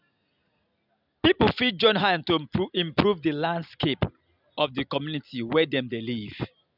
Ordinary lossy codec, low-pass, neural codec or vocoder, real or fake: none; 5.4 kHz; none; real